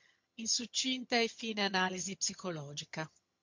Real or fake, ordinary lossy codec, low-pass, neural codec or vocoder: fake; MP3, 48 kbps; 7.2 kHz; vocoder, 22.05 kHz, 80 mel bands, HiFi-GAN